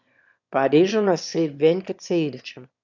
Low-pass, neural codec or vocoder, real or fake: 7.2 kHz; autoencoder, 22.05 kHz, a latent of 192 numbers a frame, VITS, trained on one speaker; fake